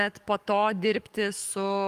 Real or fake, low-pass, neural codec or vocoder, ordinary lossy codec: fake; 14.4 kHz; codec, 44.1 kHz, 7.8 kbps, DAC; Opus, 32 kbps